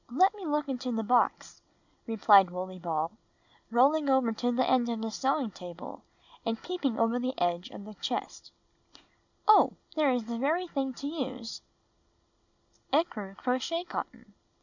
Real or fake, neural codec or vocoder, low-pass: real; none; 7.2 kHz